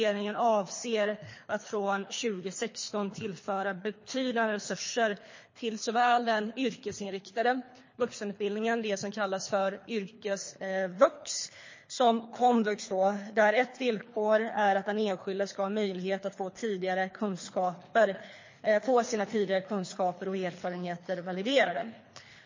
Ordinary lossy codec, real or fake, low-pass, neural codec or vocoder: MP3, 32 kbps; fake; 7.2 kHz; codec, 24 kHz, 3 kbps, HILCodec